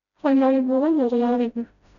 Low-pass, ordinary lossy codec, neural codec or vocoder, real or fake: 7.2 kHz; none; codec, 16 kHz, 0.5 kbps, FreqCodec, smaller model; fake